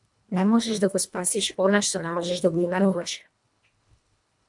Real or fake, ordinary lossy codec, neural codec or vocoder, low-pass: fake; MP3, 96 kbps; codec, 24 kHz, 1.5 kbps, HILCodec; 10.8 kHz